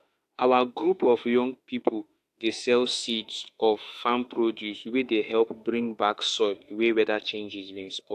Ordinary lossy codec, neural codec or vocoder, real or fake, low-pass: AAC, 64 kbps; autoencoder, 48 kHz, 32 numbers a frame, DAC-VAE, trained on Japanese speech; fake; 14.4 kHz